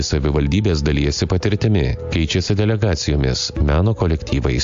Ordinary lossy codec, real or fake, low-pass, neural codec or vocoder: AAC, 64 kbps; real; 7.2 kHz; none